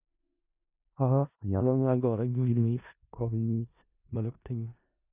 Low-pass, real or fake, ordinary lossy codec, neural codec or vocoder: 3.6 kHz; fake; none; codec, 16 kHz in and 24 kHz out, 0.4 kbps, LongCat-Audio-Codec, four codebook decoder